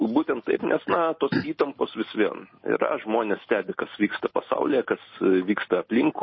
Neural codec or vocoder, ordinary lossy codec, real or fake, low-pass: none; MP3, 24 kbps; real; 7.2 kHz